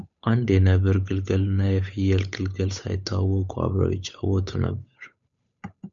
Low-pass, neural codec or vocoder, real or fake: 7.2 kHz; codec, 16 kHz, 8 kbps, FunCodec, trained on Chinese and English, 25 frames a second; fake